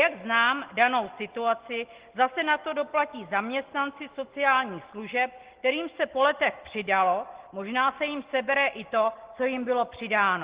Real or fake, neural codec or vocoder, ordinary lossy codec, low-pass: real; none; Opus, 16 kbps; 3.6 kHz